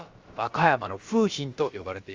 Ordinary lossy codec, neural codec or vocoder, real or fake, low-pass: Opus, 32 kbps; codec, 16 kHz, about 1 kbps, DyCAST, with the encoder's durations; fake; 7.2 kHz